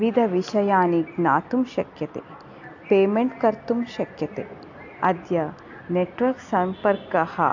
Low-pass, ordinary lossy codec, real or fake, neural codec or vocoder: 7.2 kHz; AAC, 48 kbps; real; none